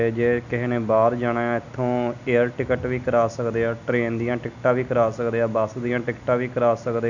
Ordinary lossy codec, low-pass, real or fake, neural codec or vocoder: none; 7.2 kHz; real; none